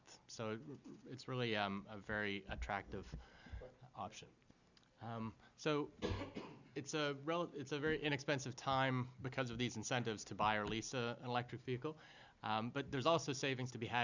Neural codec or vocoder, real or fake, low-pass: none; real; 7.2 kHz